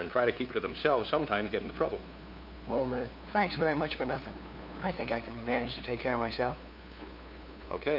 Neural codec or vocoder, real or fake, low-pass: codec, 16 kHz, 2 kbps, FunCodec, trained on LibriTTS, 25 frames a second; fake; 5.4 kHz